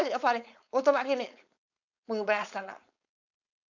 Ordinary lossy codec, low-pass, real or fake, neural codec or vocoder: none; 7.2 kHz; fake; codec, 16 kHz, 4.8 kbps, FACodec